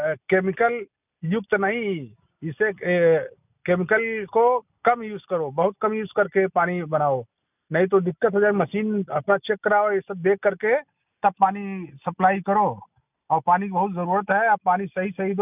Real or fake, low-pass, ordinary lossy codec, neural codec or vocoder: real; 3.6 kHz; none; none